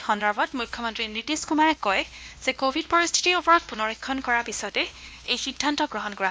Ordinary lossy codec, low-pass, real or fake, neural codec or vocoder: none; none; fake; codec, 16 kHz, 1 kbps, X-Codec, WavLM features, trained on Multilingual LibriSpeech